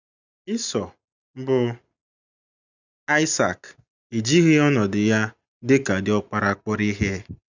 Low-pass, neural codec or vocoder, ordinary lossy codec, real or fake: 7.2 kHz; none; none; real